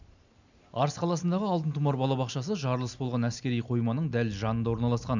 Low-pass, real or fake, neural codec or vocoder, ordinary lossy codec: 7.2 kHz; real; none; none